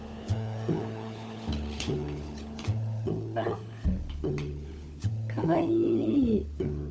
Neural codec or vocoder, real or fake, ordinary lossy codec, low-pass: codec, 16 kHz, 16 kbps, FunCodec, trained on LibriTTS, 50 frames a second; fake; none; none